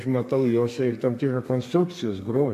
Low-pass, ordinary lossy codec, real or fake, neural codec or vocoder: 14.4 kHz; AAC, 64 kbps; fake; codec, 32 kHz, 1.9 kbps, SNAC